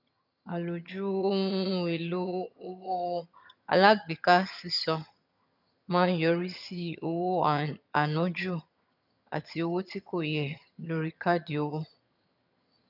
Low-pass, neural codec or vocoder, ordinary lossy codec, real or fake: 5.4 kHz; vocoder, 22.05 kHz, 80 mel bands, HiFi-GAN; none; fake